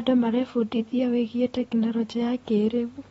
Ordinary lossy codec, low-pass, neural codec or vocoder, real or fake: AAC, 24 kbps; 19.8 kHz; vocoder, 44.1 kHz, 128 mel bands every 256 samples, BigVGAN v2; fake